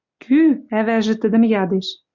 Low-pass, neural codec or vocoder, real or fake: 7.2 kHz; none; real